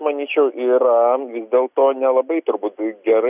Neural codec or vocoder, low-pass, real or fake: none; 3.6 kHz; real